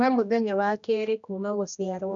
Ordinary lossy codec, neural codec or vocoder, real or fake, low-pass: MP3, 96 kbps; codec, 16 kHz, 1 kbps, X-Codec, HuBERT features, trained on general audio; fake; 7.2 kHz